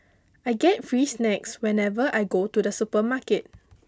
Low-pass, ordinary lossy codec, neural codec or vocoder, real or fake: none; none; none; real